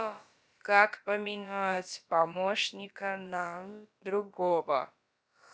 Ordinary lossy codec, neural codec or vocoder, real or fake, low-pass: none; codec, 16 kHz, about 1 kbps, DyCAST, with the encoder's durations; fake; none